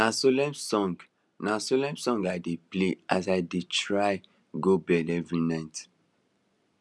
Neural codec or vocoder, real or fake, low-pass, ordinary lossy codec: none; real; none; none